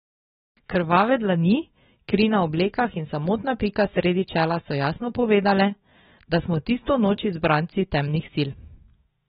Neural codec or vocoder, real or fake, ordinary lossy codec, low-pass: autoencoder, 48 kHz, 128 numbers a frame, DAC-VAE, trained on Japanese speech; fake; AAC, 16 kbps; 19.8 kHz